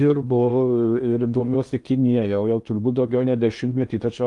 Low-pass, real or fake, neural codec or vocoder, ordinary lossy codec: 10.8 kHz; fake; codec, 16 kHz in and 24 kHz out, 0.8 kbps, FocalCodec, streaming, 65536 codes; Opus, 32 kbps